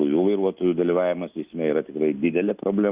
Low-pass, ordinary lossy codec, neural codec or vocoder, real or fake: 3.6 kHz; Opus, 64 kbps; none; real